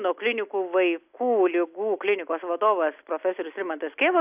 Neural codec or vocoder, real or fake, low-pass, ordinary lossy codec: none; real; 3.6 kHz; AAC, 32 kbps